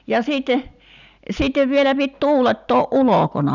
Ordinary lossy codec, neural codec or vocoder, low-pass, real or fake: none; none; 7.2 kHz; real